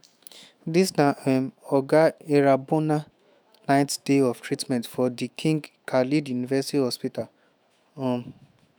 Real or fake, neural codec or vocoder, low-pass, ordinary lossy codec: fake; autoencoder, 48 kHz, 128 numbers a frame, DAC-VAE, trained on Japanese speech; none; none